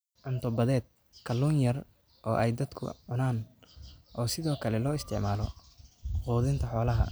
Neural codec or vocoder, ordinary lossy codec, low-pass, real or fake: none; none; none; real